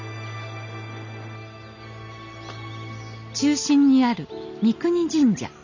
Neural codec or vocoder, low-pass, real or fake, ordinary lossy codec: none; 7.2 kHz; real; none